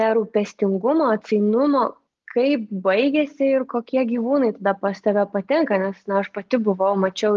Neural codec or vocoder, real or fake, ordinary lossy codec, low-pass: none; real; Opus, 32 kbps; 7.2 kHz